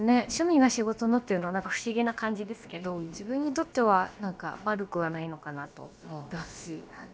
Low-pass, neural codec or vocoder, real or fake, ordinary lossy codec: none; codec, 16 kHz, about 1 kbps, DyCAST, with the encoder's durations; fake; none